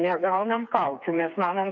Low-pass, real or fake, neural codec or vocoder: 7.2 kHz; fake; codec, 16 kHz, 4 kbps, FreqCodec, smaller model